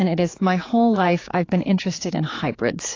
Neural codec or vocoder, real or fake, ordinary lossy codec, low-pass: codec, 16 kHz, 4 kbps, X-Codec, HuBERT features, trained on general audio; fake; AAC, 32 kbps; 7.2 kHz